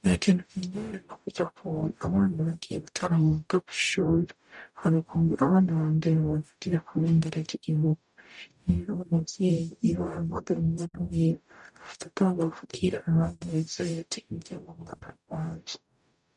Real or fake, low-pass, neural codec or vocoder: fake; 10.8 kHz; codec, 44.1 kHz, 0.9 kbps, DAC